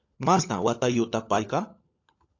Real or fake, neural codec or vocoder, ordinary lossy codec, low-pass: fake; codec, 16 kHz, 16 kbps, FunCodec, trained on LibriTTS, 50 frames a second; AAC, 48 kbps; 7.2 kHz